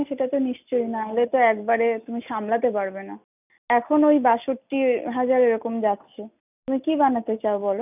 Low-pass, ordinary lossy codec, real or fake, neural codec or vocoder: 3.6 kHz; none; real; none